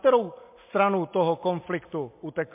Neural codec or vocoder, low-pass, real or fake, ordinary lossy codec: none; 3.6 kHz; real; MP3, 24 kbps